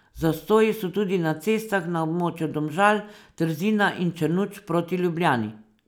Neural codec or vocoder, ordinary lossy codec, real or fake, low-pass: none; none; real; none